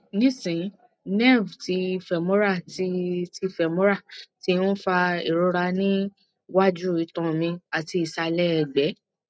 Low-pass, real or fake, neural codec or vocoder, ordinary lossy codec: none; real; none; none